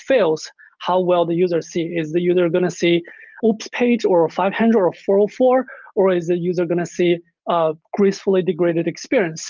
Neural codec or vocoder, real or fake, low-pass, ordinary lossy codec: none; real; 7.2 kHz; Opus, 24 kbps